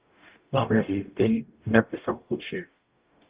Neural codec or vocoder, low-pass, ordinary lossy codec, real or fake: codec, 44.1 kHz, 0.9 kbps, DAC; 3.6 kHz; Opus, 32 kbps; fake